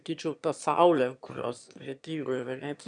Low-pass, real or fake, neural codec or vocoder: 9.9 kHz; fake; autoencoder, 22.05 kHz, a latent of 192 numbers a frame, VITS, trained on one speaker